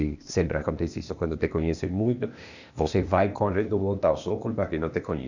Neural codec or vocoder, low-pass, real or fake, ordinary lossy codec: codec, 16 kHz, 0.8 kbps, ZipCodec; 7.2 kHz; fake; none